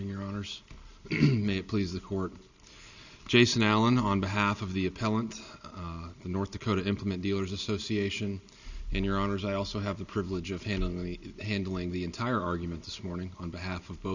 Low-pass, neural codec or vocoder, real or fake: 7.2 kHz; none; real